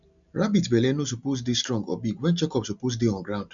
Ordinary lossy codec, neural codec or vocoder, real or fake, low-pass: none; none; real; 7.2 kHz